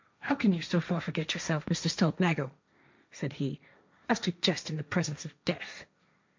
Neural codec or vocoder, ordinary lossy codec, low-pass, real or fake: codec, 16 kHz, 1.1 kbps, Voila-Tokenizer; MP3, 64 kbps; 7.2 kHz; fake